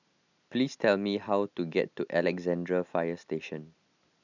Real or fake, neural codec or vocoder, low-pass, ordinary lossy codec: real; none; 7.2 kHz; none